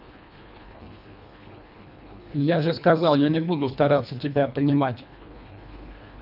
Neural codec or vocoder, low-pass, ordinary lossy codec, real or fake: codec, 24 kHz, 1.5 kbps, HILCodec; 5.4 kHz; none; fake